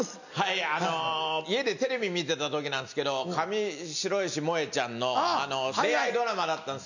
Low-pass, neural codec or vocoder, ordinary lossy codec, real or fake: 7.2 kHz; none; none; real